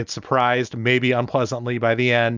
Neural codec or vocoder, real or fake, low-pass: none; real; 7.2 kHz